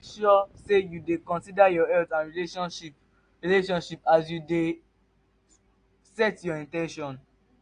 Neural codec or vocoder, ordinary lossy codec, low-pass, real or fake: none; AAC, 48 kbps; 9.9 kHz; real